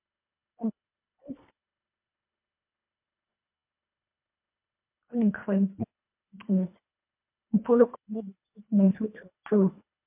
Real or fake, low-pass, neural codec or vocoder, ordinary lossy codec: fake; 3.6 kHz; codec, 24 kHz, 1.5 kbps, HILCodec; MP3, 32 kbps